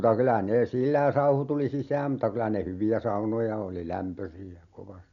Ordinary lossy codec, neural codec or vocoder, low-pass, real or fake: none; none; 7.2 kHz; real